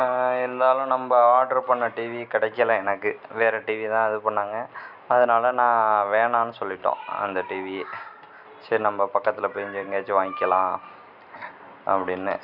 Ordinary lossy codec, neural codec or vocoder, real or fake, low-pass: none; none; real; 5.4 kHz